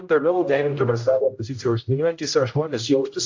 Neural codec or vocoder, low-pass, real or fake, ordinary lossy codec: codec, 16 kHz, 0.5 kbps, X-Codec, HuBERT features, trained on general audio; 7.2 kHz; fake; AAC, 48 kbps